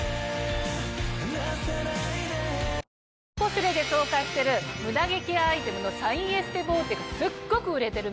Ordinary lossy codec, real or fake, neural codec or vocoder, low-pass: none; real; none; none